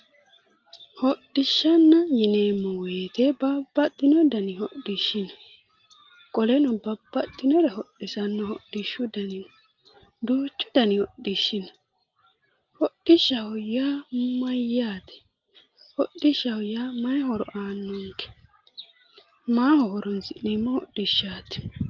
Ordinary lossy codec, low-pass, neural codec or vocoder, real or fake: Opus, 24 kbps; 7.2 kHz; none; real